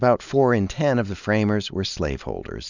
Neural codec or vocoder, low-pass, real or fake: none; 7.2 kHz; real